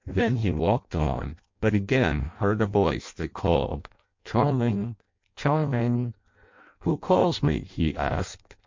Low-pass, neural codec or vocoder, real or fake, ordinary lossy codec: 7.2 kHz; codec, 16 kHz in and 24 kHz out, 0.6 kbps, FireRedTTS-2 codec; fake; MP3, 48 kbps